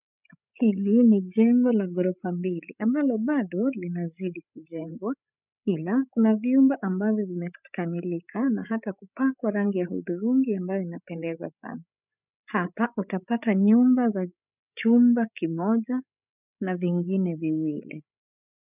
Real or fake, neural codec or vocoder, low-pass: fake; codec, 16 kHz, 8 kbps, FreqCodec, larger model; 3.6 kHz